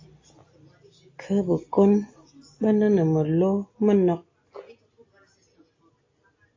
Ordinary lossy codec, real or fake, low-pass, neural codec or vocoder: AAC, 32 kbps; real; 7.2 kHz; none